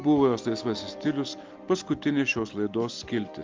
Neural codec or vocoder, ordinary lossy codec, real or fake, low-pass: none; Opus, 32 kbps; real; 7.2 kHz